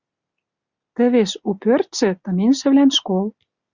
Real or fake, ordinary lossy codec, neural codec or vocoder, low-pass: real; Opus, 64 kbps; none; 7.2 kHz